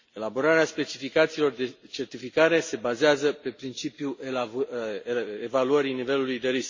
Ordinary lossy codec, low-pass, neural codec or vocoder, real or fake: MP3, 32 kbps; 7.2 kHz; none; real